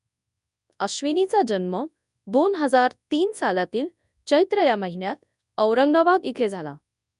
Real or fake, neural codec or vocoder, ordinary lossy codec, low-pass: fake; codec, 24 kHz, 0.9 kbps, WavTokenizer, large speech release; none; 10.8 kHz